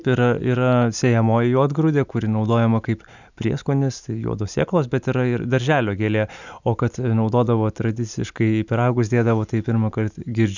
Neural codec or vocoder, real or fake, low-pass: none; real; 7.2 kHz